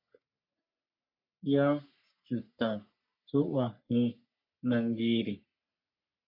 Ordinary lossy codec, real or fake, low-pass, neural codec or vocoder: MP3, 48 kbps; fake; 5.4 kHz; codec, 44.1 kHz, 3.4 kbps, Pupu-Codec